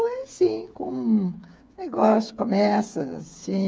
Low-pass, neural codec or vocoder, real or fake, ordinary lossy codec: none; codec, 16 kHz, 8 kbps, FreqCodec, smaller model; fake; none